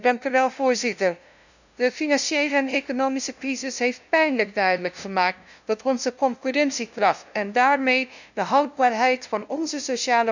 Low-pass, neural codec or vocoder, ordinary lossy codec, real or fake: 7.2 kHz; codec, 16 kHz, 0.5 kbps, FunCodec, trained on LibriTTS, 25 frames a second; none; fake